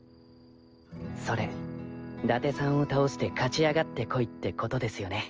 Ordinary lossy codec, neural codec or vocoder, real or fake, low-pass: Opus, 24 kbps; none; real; 7.2 kHz